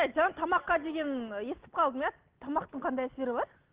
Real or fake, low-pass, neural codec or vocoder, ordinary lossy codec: real; 3.6 kHz; none; Opus, 16 kbps